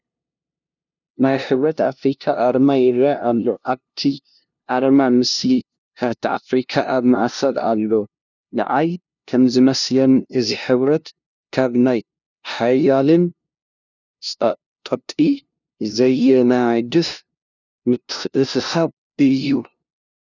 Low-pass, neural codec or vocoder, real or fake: 7.2 kHz; codec, 16 kHz, 0.5 kbps, FunCodec, trained on LibriTTS, 25 frames a second; fake